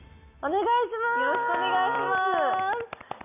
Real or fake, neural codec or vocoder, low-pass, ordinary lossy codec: real; none; 3.6 kHz; none